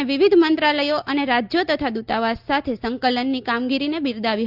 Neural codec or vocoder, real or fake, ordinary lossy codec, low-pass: none; real; Opus, 24 kbps; 5.4 kHz